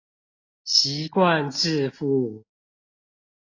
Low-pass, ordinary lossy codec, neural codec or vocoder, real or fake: 7.2 kHz; AAC, 32 kbps; none; real